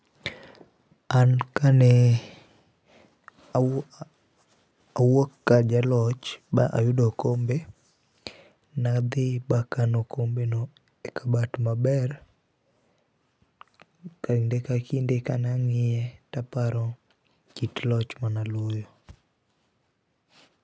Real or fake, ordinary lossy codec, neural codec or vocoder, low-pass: real; none; none; none